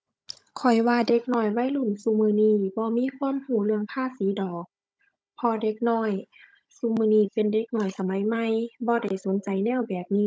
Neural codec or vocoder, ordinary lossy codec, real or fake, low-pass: codec, 16 kHz, 16 kbps, FunCodec, trained on Chinese and English, 50 frames a second; none; fake; none